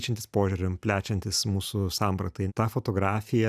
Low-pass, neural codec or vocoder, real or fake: 14.4 kHz; none; real